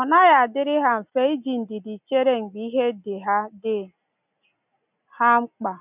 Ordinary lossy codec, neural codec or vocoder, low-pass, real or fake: none; none; 3.6 kHz; real